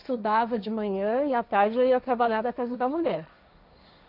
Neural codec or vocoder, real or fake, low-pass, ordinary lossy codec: codec, 16 kHz, 1.1 kbps, Voila-Tokenizer; fake; 5.4 kHz; none